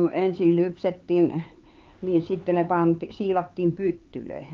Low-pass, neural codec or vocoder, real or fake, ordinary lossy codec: 7.2 kHz; codec, 16 kHz, 4 kbps, X-Codec, HuBERT features, trained on LibriSpeech; fake; Opus, 32 kbps